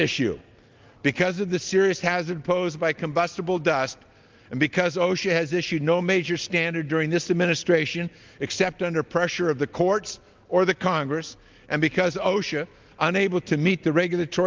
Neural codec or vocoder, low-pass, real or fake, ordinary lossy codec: none; 7.2 kHz; real; Opus, 32 kbps